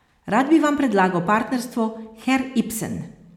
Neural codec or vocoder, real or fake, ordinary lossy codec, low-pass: none; real; MP3, 96 kbps; 19.8 kHz